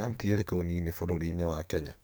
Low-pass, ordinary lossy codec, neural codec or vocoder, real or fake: none; none; codec, 44.1 kHz, 2.6 kbps, SNAC; fake